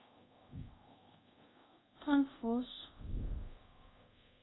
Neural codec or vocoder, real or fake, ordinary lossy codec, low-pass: codec, 24 kHz, 0.5 kbps, DualCodec; fake; AAC, 16 kbps; 7.2 kHz